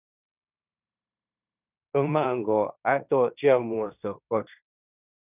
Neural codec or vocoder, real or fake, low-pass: codec, 16 kHz in and 24 kHz out, 0.9 kbps, LongCat-Audio-Codec, fine tuned four codebook decoder; fake; 3.6 kHz